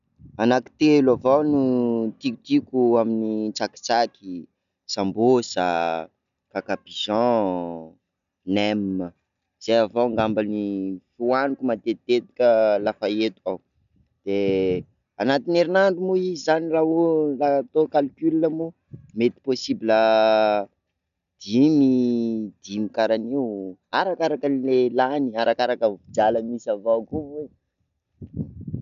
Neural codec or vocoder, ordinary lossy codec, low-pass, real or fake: none; none; 7.2 kHz; real